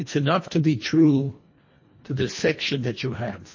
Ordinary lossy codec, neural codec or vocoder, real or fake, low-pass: MP3, 32 kbps; codec, 24 kHz, 1.5 kbps, HILCodec; fake; 7.2 kHz